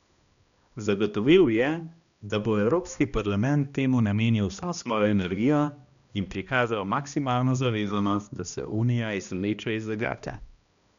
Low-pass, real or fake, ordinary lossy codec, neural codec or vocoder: 7.2 kHz; fake; none; codec, 16 kHz, 1 kbps, X-Codec, HuBERT features, trained on balanced general audio